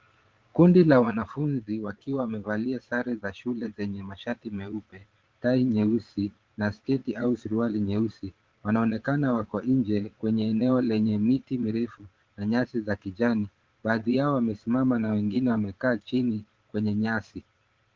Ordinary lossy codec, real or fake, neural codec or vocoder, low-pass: Opus, 16 kbps; fake; vocoder, 22.05 kHz, 80 mel bands, Vocos; 7.2 kHz